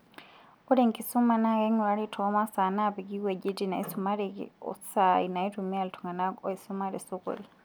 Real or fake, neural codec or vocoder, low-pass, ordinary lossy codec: real; none; none; none